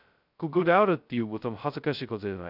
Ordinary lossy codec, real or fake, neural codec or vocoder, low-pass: none; fake; codec, 16 kHz, 0.2 kbps, FocalCodec; 5.4 kHz